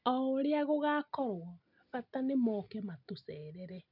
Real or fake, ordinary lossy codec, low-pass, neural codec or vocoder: real; none; 5.4 kHz; none